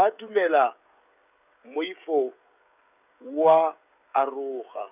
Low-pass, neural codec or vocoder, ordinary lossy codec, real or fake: 3.6 kHz; codec, 16 kHz, 16 kbps, FreqCodec, smaller model; none; fake